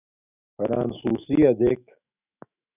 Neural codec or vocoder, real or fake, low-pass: autoencoder, 48 kHz, 128 numbers a frame, DAC-VAE, trained on Japanese speech; fake; 3.6 kHz